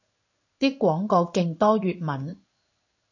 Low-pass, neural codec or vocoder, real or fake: 7.2 kHz; codec, 16 kHz in and 24 kHz out, 1 kbps, XY-Tokenizer; fake